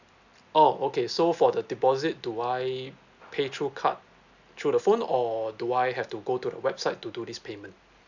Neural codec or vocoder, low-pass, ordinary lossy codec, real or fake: none; 7.2 kHz; none; real